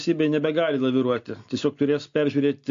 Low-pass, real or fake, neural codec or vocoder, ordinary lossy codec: 7.2 kHz; real; none; AAC, 48 kbps